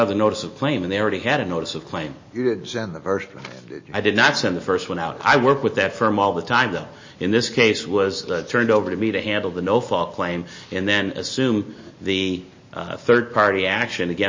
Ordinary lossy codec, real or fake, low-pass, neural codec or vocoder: MP3, 32 kbps; real; 7.2 kHz; none